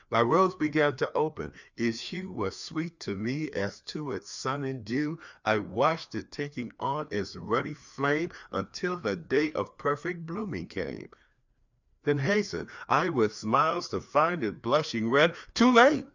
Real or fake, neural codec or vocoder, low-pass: fake; codec, 16 kHz, 2 kbps, FreqCodec, larger model; 7.2 kHz